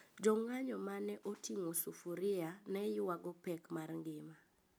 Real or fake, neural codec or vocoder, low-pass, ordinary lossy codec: real; none; none; none